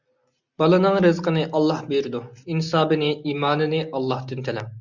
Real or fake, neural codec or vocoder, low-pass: real; none; 7.2 kHz